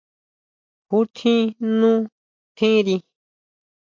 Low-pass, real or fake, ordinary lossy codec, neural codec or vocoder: 7.2 kHz; real; MP3, 64 kbps; none